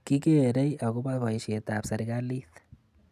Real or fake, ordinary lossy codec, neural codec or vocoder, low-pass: real; none; none; 14.4 kHz